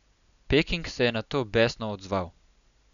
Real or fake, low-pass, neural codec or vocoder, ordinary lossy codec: real; 7.2 kHz; none; none